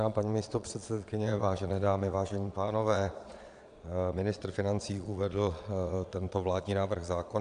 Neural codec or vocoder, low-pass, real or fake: vocoder, 22.05 kHz, 80 mel bands, Vocos; 9.9 kHz; fake